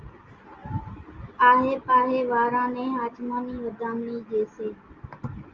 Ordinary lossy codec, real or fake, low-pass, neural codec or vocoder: Opus, 32 kbps; real; 7.2 kHz; none